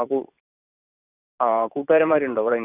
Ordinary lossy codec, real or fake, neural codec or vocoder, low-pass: none; fake; vocoder, 44.1 kHz, 128 mel bands every 512 samples, BigVGAN v2; 3.6 kHz